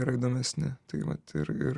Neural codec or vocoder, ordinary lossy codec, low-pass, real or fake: none; Opus, 64 kbps; 10.8 kHz; real